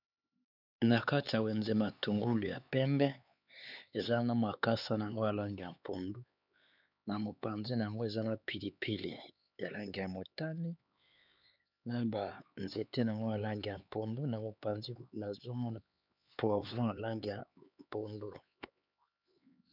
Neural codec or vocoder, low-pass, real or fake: codec, 16 kHz, 4 kbps, X-Codec, HuBERT features, trained on LibriSpeech; 5.4 kHz; fake